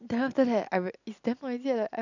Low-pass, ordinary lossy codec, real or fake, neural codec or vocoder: 7.2 kHz; none; real; none